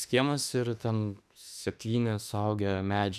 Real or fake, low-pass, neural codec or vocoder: fake; 14.4 kHz; autoencoder, 48 kHz, 32 numbers a frame, DAC-VAE, trained on Japanese speech